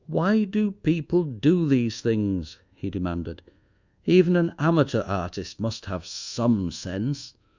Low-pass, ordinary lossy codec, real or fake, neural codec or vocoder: 7.2 kHz; Opus, 64 kbps; fake; codec, 24 kHz, 1.2 kbps, DualCodec